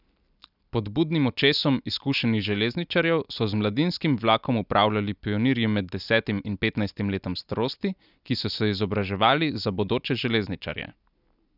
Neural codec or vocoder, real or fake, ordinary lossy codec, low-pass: none; real; none; 5.4 kHz